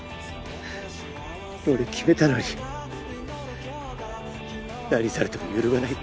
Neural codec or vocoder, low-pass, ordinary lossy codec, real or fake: none; none; none; real